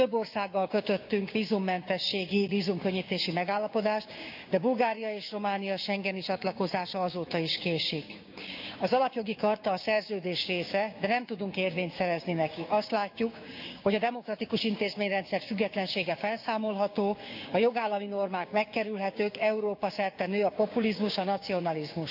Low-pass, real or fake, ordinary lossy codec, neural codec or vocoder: 5.4 kHz; fake; none; codec, 16 kHz, 6 kbps, DAC